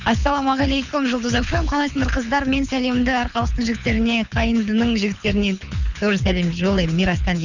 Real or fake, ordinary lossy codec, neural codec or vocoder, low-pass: fake; none; codec, 24 kHz, 6 kbps, HILCodec; 7.2 kHz